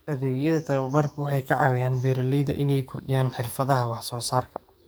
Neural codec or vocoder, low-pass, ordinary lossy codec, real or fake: codec, 44.1 kHz, 2.6 kbps, SNAC; none; none; fake